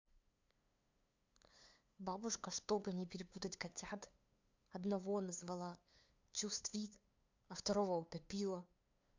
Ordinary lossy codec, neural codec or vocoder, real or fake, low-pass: MP3, 64 kbps; codec, 16 kHz, 2 kbps, FunCodec, trained on LibriTTS, 25 frames a second; fake; 7.2 kHz